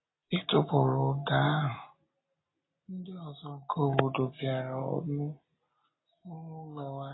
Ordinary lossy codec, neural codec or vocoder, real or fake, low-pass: AAC, 16 kbps; none; real; 7.2 kHz